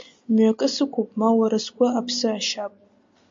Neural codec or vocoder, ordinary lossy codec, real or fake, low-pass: none; MP3, 48 kbps; real; 7.2 kHz